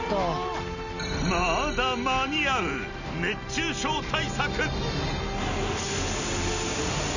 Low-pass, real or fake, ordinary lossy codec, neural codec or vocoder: 7.2 kHz; real; none; none